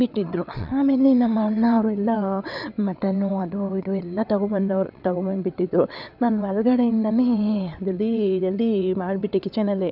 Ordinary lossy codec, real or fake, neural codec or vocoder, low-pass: none; fake; vocoder, 22.05 kHz, 80 mel bands, WaveNeXt; 5.4 kHz